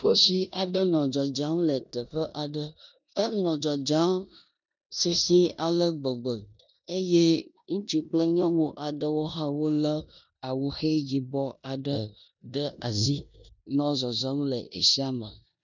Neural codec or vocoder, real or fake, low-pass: codec, 16 kHz in and 24 kHz out, 0.9 kbps, LongCat-Audio-Codec, four codebook decoder; fake; 7.2 kHz